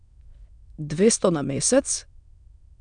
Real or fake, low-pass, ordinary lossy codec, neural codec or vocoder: fake; 9.9 kHz; none; autoencoder, 22.05 kHz, a latent of 192 numbers a frame, VITS, trained on many speakers